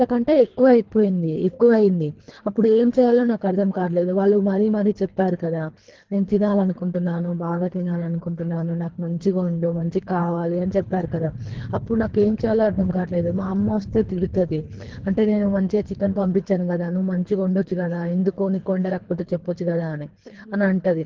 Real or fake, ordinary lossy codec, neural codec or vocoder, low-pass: fake; Opus, 16 kbps; codec, 24 kHz, 3 kbps, HILCodec; 7.2 kHz